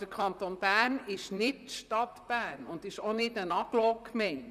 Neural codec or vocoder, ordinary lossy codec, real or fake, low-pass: vocoder, 44.1 kHz, 128 mel bands, Pupu-Vocoder; none; fake; 14.4 kHz